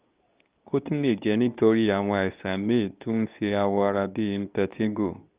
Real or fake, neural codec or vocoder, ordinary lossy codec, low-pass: fake; codec, 24 kHz, 0.9 kbps, WavTokenizer, medium speech release version 2; Opus, 24 kbps; 3.6 kHz